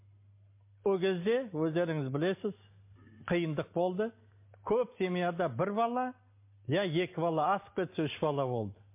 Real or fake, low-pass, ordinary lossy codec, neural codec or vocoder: real; 3.6 kHz; MP3, 24 kbps; none